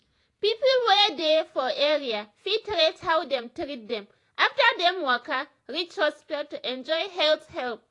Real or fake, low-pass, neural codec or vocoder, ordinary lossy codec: fake; 10.8 kHz; vocoder, 48 kHz, 128 mel bands, Vocos; AAC, 48 kbps